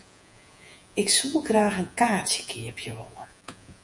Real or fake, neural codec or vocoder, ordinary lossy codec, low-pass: fake; vocoder, 48 kHz, 128 mel bands, Vocos; MP3, 96 kbps; 10.8 kHz